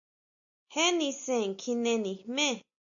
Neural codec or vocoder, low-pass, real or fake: none; 7.2 kHz; real